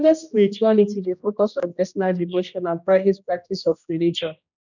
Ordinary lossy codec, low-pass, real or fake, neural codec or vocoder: none; 7.2 kHz; fake; codec, 16 kHz, 1 kbps, X-Codec, HuBERT features, trained on general audio